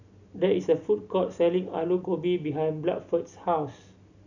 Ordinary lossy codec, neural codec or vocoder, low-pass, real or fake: none; none; 7.2 kHz; real